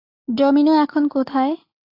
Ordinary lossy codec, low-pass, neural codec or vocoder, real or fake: AAC, 32 kbps; 5.4 kHz; none; real